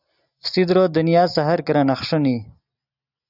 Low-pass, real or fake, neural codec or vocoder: 5.4 kHz; real; none